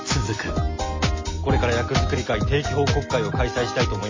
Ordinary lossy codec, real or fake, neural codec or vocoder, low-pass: MP3, 32 kbps; real; none; 7.2 kHz